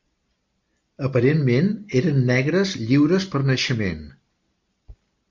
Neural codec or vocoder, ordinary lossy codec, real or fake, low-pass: none; MP3, 64 kbps; real; 7.2 kHz